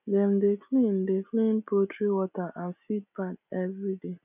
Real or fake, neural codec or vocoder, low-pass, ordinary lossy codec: real; none; 3.6 kHz; none